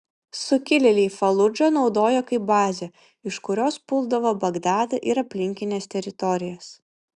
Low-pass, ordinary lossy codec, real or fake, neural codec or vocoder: 10.8 kHz; Opus, 64 kbps; real; none